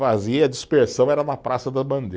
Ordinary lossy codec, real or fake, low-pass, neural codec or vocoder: none; real; none; none